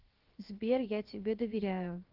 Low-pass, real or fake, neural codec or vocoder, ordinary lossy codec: 5.4 kHz; fake; codec, 16 kHz, 0.7 kbps, FocalCodec; Opus, 16 kbps